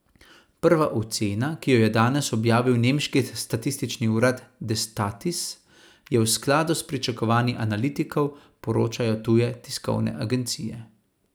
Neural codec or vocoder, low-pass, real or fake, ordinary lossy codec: none; none; real; none